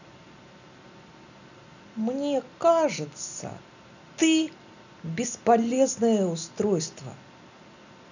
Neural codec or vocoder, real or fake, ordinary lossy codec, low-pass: none; real; none; 7.2 kHz